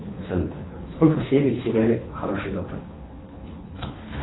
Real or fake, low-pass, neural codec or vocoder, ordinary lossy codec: fake; 7.2 kHz; codec, 24 kHz, 3 kbps, HILCodec; AAC, 16 kbps